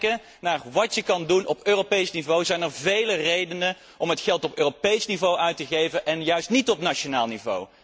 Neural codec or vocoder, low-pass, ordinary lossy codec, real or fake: none; none; none; real